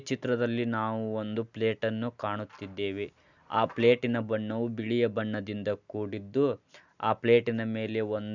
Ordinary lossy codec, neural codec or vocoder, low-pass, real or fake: none; none; 7.2 kHz; real